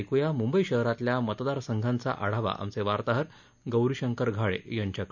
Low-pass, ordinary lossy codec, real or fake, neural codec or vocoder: 7.2 kHz; none; real; none